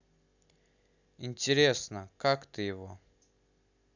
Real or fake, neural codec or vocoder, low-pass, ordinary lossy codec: real; none; 7.2 kHz; none